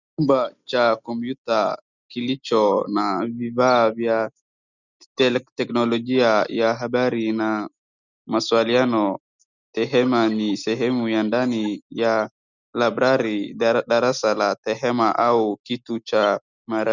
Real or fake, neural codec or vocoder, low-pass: real; none; 7.2 kHz